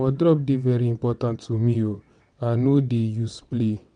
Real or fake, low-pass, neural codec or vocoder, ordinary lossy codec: fake; 9.9 kHz; vocoder, 22.05 kHz, 80 mel bands, WaveNeXt; MP3, 64 kbps